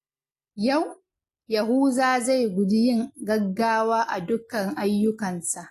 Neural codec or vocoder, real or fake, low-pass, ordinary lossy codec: none; real; 14.4 kHz; AAC, 48 kbps